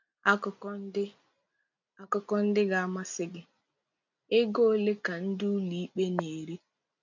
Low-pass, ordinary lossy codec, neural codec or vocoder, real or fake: 7.2 kHz; none; none; real